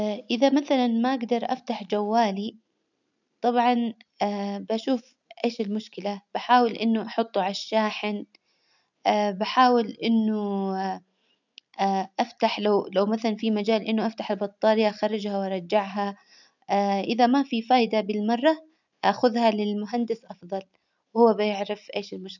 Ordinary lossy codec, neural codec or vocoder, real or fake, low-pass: none; none; real; 7.2 kHz